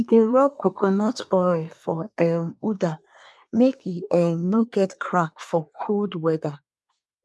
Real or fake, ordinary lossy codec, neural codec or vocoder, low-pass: fake; none; codec, 24 kHz, 1 kbps, SNAC; none